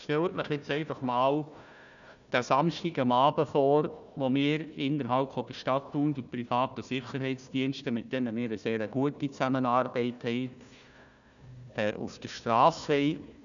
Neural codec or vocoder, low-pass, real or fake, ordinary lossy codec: codec, 16 kHz, 1 kbps, FunCodec, trained on Chinese and English, 50 frames a second; 7.2 kHz; fake; none